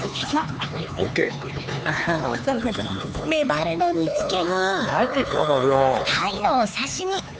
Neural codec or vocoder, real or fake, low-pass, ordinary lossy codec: codec, 16 kHz, 4 kbps, X-Codec, HuBERT features, trained on LibriSpeech; fake; none; none